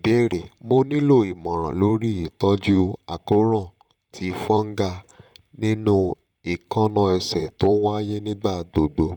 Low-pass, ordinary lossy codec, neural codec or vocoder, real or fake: 19.8 kHz; none; vocoder, 44.1 kHz, 128 mel bands, Pupu-Vocoder; fake